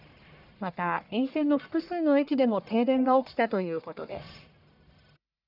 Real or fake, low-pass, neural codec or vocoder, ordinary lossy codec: fake; 5.4 kHz; codec, 44.1 kHz, 1.7 kbps, Pupu-Codec; none